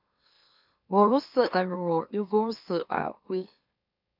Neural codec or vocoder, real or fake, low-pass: autoencoder, 44.1 kHz, a latent of 192 numbers a frame, MeloTTS; fake; 5.4 kHz